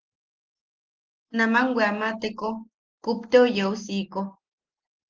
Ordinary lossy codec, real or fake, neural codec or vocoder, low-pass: Opus, 16 kbps; real; none; 7.2 kHz